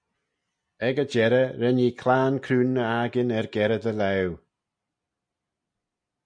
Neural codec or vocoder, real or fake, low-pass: none; real; 9.9 kHz